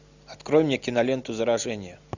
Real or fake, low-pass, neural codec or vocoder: real; 7.2 kHz; none